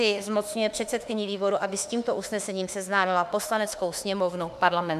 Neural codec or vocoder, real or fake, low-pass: autoencoder, 48 kHz, 32 numbers a frame, DAC-VAE, trained on Japanese speech; fake; 14.4 kHz